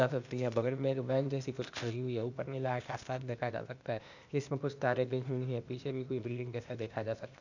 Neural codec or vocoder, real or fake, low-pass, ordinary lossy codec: codec, 16 kHz, 0.8 kbps, ZipCodec; fake; 7.2 kHz; none